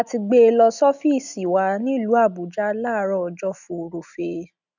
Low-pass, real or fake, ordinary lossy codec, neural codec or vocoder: 7.2 kHz; real; none; none